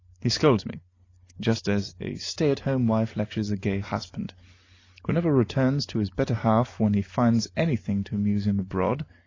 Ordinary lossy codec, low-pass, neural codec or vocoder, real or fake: AAC, 32 kbps; 7.2 kHz; none; real